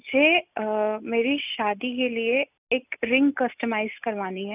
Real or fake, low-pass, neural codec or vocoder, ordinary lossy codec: real; 3.6 kHz; none; none